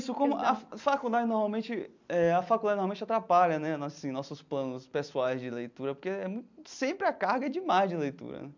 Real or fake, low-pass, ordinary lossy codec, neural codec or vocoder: real; 7.2 kHz; MP3, 64 kbps; none